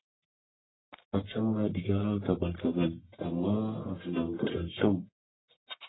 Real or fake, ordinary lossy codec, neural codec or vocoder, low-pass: fake; AAC, 16 kbps; codec, 44.1 kHz, 1.7 kbps, Pupu-Codec; 7.2 kHz